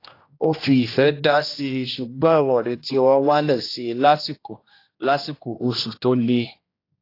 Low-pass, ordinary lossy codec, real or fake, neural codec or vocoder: 5.4 kHz; AAC, 32 kbps; fake; codec, 16 kHz, 1 kbps, X-Codec, HuBERT features, trained on general audio